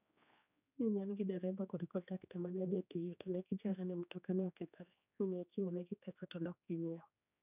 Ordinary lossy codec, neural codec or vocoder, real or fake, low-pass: none; codec, 16 kHz, 2 kbps, X-Codec, HuBERT features, trained on general audio; fake; 3.6 kHz